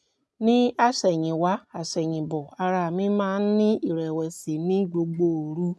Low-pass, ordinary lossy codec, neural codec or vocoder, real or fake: none; none; none; real